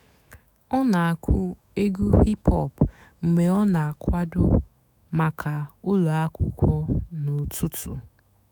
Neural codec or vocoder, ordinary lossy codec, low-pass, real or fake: autoencoder, 48 kHz, 128 numbers a frame, DAC-VAE, trained on Japanese speech; none; none; fake